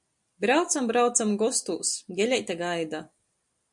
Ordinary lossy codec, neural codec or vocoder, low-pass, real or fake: MP3, 64 kbps; none; 10.8 kHz; real